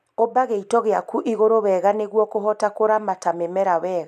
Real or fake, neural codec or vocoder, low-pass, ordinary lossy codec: real; none; 14.4 kHz; none